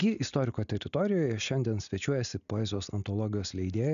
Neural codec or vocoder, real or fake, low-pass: none; real; 7.2 kHz